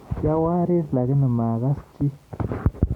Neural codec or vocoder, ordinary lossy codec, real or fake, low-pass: none; none; real; 19.8 kHz